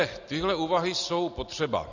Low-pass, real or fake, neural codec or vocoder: 7.2 kHz; real; none